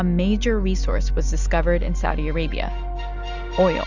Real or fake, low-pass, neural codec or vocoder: real; 7.2 kHz; none